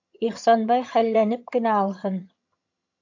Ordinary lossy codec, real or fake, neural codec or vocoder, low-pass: AAC, 48 kbps; fake; vocoder, 22.05 kHz, 80 mel bands, HiFi-GAN; 7.2 kHz